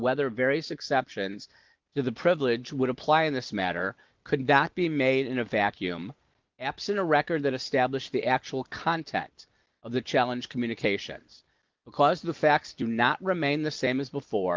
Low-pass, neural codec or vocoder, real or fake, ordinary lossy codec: 7.2 kHz; none; real; Opus, 24 kbps